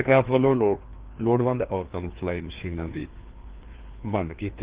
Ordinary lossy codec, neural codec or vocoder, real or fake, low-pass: Opus, 16 kbps; codec, 16 kHz, 2 kbps, FreqCodec, larger model; fake; 3.6 kHz